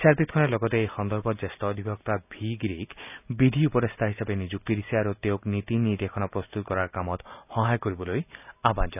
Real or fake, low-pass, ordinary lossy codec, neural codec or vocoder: real; 3.6 kHz; none; none